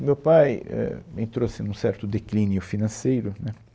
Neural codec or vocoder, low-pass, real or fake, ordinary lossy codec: none; none; real; none